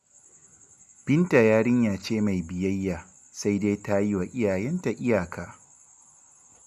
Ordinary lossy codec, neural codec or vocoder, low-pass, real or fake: none; none; 14.4 kHz; real